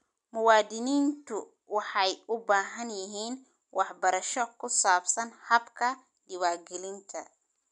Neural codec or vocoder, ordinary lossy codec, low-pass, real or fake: none; none; 10.8 kHz; real